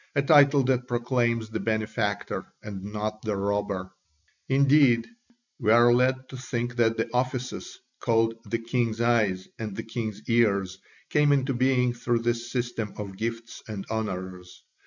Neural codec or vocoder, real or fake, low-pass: none; real; 7.2 kHz